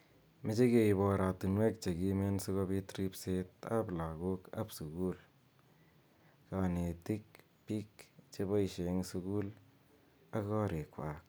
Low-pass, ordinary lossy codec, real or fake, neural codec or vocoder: none; none; real; none